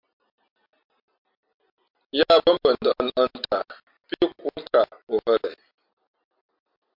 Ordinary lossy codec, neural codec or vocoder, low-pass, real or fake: MP3, 48 kbps; none; 5.4 kHz; real